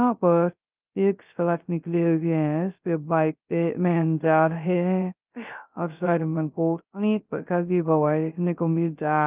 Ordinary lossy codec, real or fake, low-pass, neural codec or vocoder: Opus, 24 kbps; fake; 3.6 kHz; codec, 16 kHz, 0.2 kbps, FocalCodec